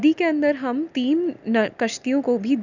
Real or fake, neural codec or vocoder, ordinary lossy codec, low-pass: real; none; none; 7.2 kHz